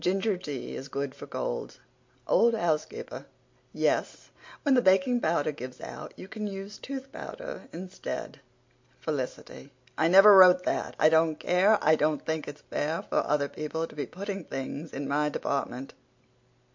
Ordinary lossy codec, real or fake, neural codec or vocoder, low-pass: MP3, 48 kbps; real; none; 7.2 kHz